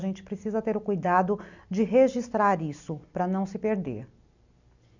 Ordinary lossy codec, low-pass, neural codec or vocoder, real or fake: none; 7.2 kHz; none; real